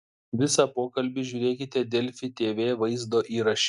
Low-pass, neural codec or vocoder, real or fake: 10.8 kHz; none; real